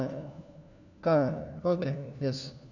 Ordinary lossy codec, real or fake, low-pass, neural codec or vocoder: none; fake; 7.2 kHz; codec, 16 kHz, 1 kbps, FunCodec, trained on LibriTTS, 50 frames a second